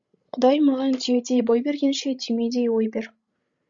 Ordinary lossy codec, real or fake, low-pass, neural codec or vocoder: Opus, 64 kbps; fake; 7.2 kHz; codec, 16 kHz, 16 kbps, FreqCodec, larger model